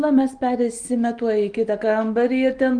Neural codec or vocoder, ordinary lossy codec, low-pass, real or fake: none; Opus, 32 kbps; 9.9 kHz; real